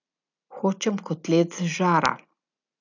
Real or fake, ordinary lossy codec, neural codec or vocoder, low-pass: real; none; none; 7.2 kHz